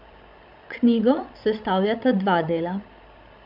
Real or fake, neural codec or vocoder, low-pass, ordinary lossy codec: fake; codec, 16 kHz, 16 kbps, FreqCodec, larger model; 5.4 kHz; none